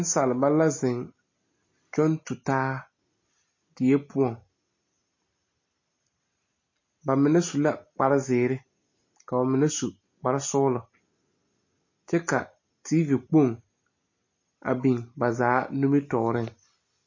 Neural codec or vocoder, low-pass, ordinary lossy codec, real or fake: none; 7.2 kHz; MP3, 32 kbps; real